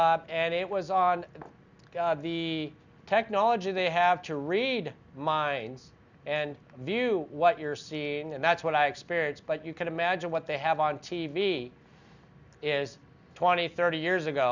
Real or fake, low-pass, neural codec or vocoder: real; 7.2 kHz; none